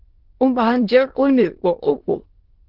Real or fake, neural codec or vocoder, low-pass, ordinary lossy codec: fake; autoencoder, 22.05 kHz, a latent of 192 numbers a frame, VITS, trained on many speakers; 5.4 kHz; Opus, 16 kbps